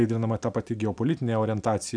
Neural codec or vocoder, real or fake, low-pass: none; real; 9.9 kHz